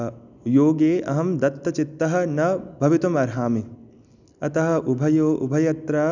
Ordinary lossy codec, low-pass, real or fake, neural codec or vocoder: none; 7.2 kHz; real; none